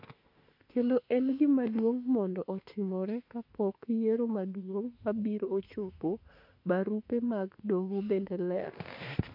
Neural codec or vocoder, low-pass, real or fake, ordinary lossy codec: autoencoder, 48 kHz, 32 numbers a frame, DAC-VAE, trained on Japanese speech; 5.4 kHz; fake; MP3, 48 kbps